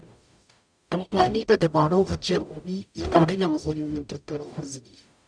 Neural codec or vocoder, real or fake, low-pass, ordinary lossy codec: codec, 44.1 kHz, 0.9 kbps, DAC; fake; 9.9 kHz; none